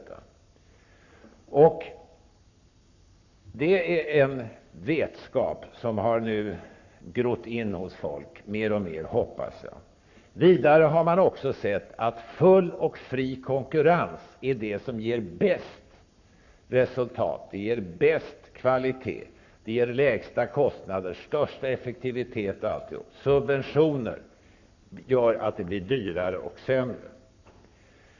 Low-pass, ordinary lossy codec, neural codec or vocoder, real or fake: 7.2 kHz; none; codec, 44.1 kHz, 7.8 kbps, Pupu-Codec; fake